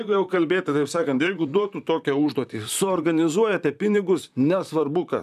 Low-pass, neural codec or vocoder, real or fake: 14.4 kHz; vocoder, 44.1 kHz, 128 mel bands, Pupu-Vocoder; fake